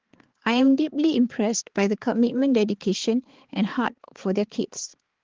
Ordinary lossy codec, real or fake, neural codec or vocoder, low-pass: Opus, 16 kbps; fake; codec, 16 kHz, 4 kbps, X-Codec, HuBERT features, trained on balanced general audio; 7.2 kHz